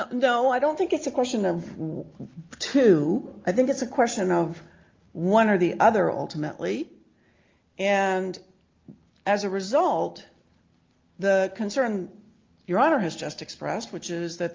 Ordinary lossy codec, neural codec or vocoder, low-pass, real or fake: Opus, 32 kbps; none; 7.2 kHz; real